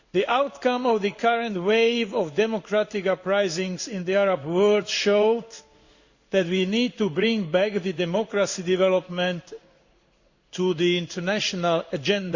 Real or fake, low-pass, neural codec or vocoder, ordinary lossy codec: fake; 7.2 kHz; codec, 16 kHz in and 24 kHz out, 1 kbps, XY-Tokenizer; Opus, 64 kbps